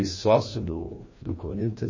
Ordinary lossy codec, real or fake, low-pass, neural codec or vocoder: MP3, 32 kbps; fake; 7.2 kHz; codec, 16 kHz, 1 kbps, FreqCodec, larger model